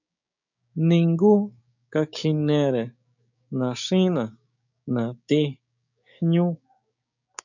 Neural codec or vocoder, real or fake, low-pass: codec, 16 kHz, 6 kbps, DAC; fake; 7.2 kHz